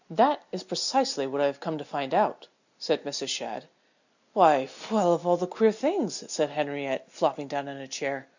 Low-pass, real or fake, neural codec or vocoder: 7.2 kHz; real; none